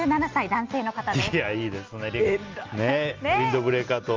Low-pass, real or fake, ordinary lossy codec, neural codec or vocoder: 7.2 kHz; real; Opus, 24 kbps; none